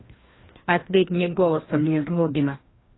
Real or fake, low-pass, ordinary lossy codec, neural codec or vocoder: fake; 7.2 kHz; AAC, 16 kbps; codec, 16 kHz, 1 kbps, FreqCodec, larger model